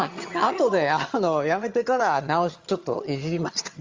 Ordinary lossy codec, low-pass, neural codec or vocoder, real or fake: Opus, 32 kbps; 7.2 kHz; vocoder, 22.05 kHz, 80 mel bands, HiFi-GAN; fake